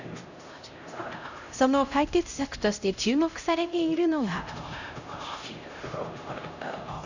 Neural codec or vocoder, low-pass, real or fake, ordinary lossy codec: codec, 16 kHz, 0.5 kbps, X-Codec, HuBERT features, trained on LibriSpeech; 7.2 kHz; fake; none